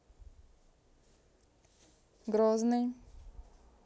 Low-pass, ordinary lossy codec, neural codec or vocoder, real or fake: none; none; none; real